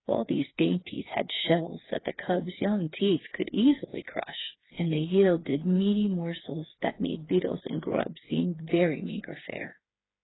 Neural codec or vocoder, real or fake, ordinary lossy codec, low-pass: codec, 16 kHz, 4 kbps, FreqCodec, smaller model; fake; AAC, 16 kbps; 7.2 kHz